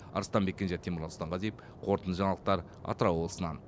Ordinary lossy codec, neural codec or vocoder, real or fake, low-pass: none; none; real; none